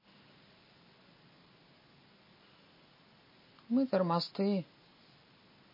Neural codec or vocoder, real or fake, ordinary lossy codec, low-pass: none; real; MP3, 24 kbps; 5.4 kHz